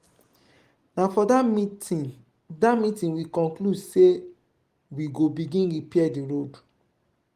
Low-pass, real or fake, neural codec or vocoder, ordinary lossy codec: 14.4 kHz; real; none; Opus, 24 kbps